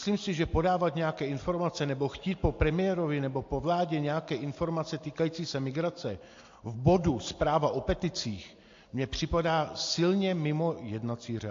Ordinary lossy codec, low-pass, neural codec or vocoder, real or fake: AAC, 48 kbps; 7.2 kHz; none; real